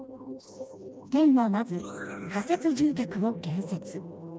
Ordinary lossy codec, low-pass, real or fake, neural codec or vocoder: none; none; fake; codec, 16 kHz, 1 kbps, FreqCodec, smaller model